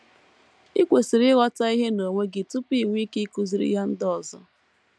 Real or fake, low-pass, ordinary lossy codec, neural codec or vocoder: real; none; none; none